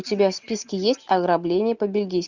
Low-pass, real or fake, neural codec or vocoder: 7.2 kHz; real; none